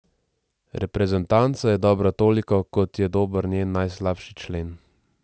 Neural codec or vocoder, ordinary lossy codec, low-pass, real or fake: none; none; none; real